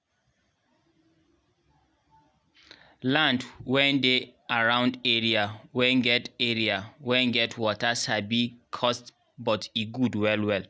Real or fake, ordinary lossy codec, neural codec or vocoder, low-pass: real; none; none; none